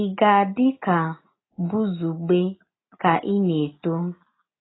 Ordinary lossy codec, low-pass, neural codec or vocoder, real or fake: AAC, 16 kbps; 7.2 kHz; none; real